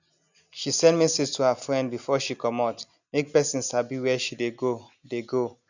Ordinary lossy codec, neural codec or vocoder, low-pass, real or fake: none; none; 7.2 kHz; real